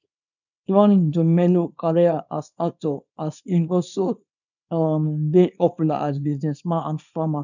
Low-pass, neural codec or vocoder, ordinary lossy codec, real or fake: 7.2 kHz; codec, 24 kHz, 0.9 kbps, WavTokenizer, small release; none; fake